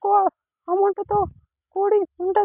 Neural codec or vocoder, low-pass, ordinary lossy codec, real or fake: none; 3.6 kHz; none; real